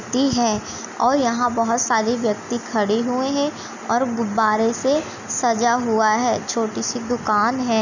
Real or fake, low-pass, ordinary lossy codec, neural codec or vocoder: real; 7.2 kHz; none; none